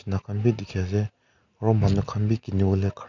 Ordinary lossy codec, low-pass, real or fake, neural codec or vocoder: none; 7.2 kHz; real; none